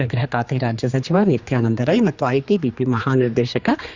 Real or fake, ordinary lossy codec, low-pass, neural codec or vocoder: fake; Opus, 64 kbps; 7.2 kHz; codec, 16 kHz, 2 kbps, X-Codec, HuBERT features, trained on general audio